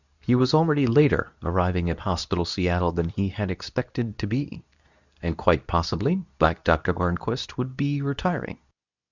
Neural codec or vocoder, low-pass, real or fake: codec, 24 kHz, 0.9 kbps, WavTokenizer, medium speech release version 2; 7.2 kHz; fake